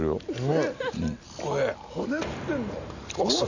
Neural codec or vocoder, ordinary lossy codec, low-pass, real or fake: none; none; 7.2 kHz; real